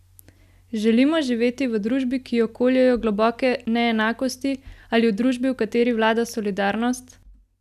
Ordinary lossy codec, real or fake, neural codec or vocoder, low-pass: none; real; none; 14.4 kHz